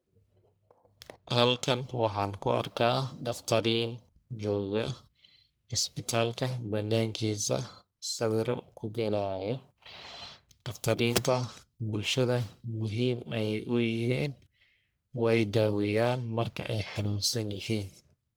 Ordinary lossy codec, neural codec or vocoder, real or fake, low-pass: none; codec, 44.1 kHz, 1.7 kbps, Pupu-Codec; fake; none